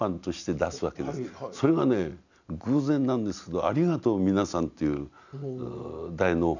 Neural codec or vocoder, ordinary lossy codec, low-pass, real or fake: none; none; 7.2 kHz; real